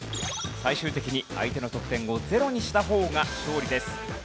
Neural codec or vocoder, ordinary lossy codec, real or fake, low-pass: none; none; real; none